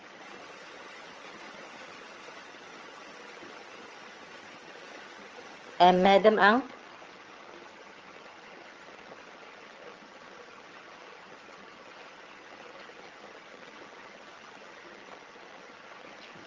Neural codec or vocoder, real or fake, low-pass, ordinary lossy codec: vocoder, 22.05 kHz, 80 mel bands, HiFi-GAN; fake; 7.2 kHz; Opus, 24 kbps